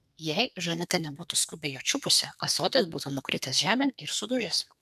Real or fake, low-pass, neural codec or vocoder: fake; 14.4 kHz; codec, 32 kHz, 1.9 kbps, SNAC